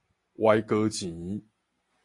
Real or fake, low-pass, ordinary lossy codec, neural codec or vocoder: real; 10.8 kHz; AAC, 48 kbps; none